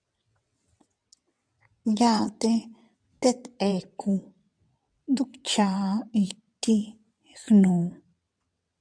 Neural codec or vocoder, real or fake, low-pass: vocoder, 22.05 kHz, 80 mel bands, WaveNeXt; fake; 9.9 kHz